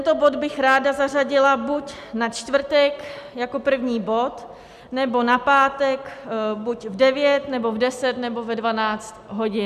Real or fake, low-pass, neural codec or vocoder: real; 14.4 kHz; none